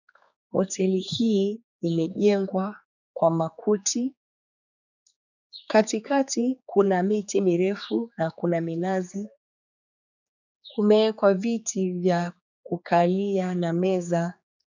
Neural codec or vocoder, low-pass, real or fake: codec, 16 kHz, 4 kbps, X-Codec, HuBERT features, trained on general audio; 7.2 kHz; fake